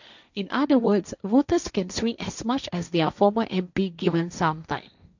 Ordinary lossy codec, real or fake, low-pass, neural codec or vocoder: none; fake; 7.2 kHz; codec, 16 kHz, 1.1 kbps, Voila-Tokenizer